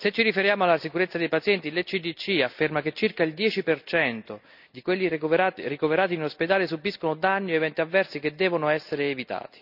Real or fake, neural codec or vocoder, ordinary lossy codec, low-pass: real; none; none; 5.4 kHz